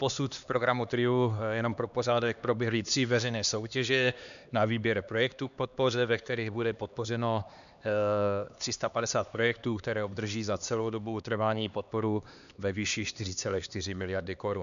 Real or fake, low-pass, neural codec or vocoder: fake; 7.2 kHz; codec, 16 kHz, 2 kbps, X-Codec, HuBERT features, trained on LibriSpeech